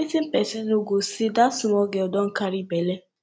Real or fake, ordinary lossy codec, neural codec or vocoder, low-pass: real; none; none; none